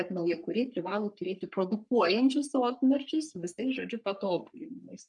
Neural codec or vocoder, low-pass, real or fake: codec, 44.1 kHz, 3.4 kbps, Pupu-Codec; 10.8 kHz; fake